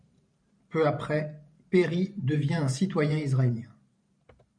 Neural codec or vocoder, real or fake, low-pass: vocoder, 44.1 kHz, 128 mel bands every 512 samples, BigVGAN v2; fake; 9.9 kHz